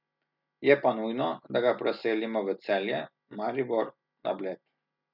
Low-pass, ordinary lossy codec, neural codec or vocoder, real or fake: 5.4 kHz; none; none; real